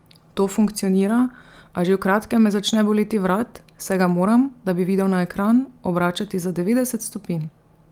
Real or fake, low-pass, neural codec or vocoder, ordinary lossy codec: real; 19.8 kHz; none; Opus, 32 kbps